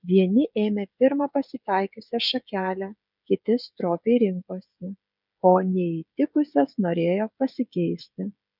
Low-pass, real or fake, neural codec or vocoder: 5.4 kHz; fake; codec, 16 kHz, 16 kbps, FreqCodec, smaller model